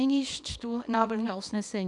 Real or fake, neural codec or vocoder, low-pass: fake; codec, 24 kHz, 0.9 kbps, WavTokenizer, small release; 10.8 kHz